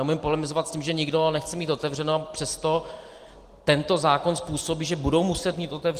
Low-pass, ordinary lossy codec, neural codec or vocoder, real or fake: 14.4 kHz; Opus, 24 kbps; none; real